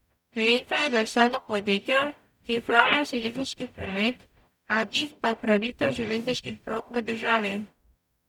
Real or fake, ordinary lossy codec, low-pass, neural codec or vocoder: fake; none; 19.8 kHz; codec, 44.1 kHz, 0.9 kbps, DAC